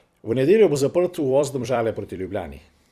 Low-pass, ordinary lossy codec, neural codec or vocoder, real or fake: 14.4 kHz; Opus, 64 kbps; vocoder, 44.1 kHz, 128 mel bands every 256 samples, BigVGAN v2; fake